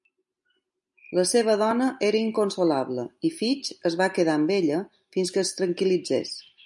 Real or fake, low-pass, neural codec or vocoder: real; 10.8 kHz; none